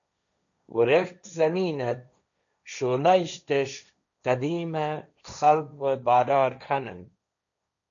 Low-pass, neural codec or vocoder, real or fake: 7.2 kHz; codec, 16 kHz, 1.1 kbps, Voila-Tokenizer; fake